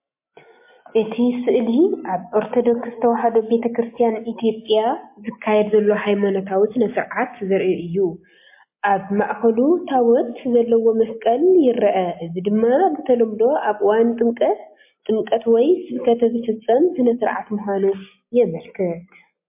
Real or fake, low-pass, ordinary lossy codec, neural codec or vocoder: real; 3.6 kHz; MP3, 24 kbps; none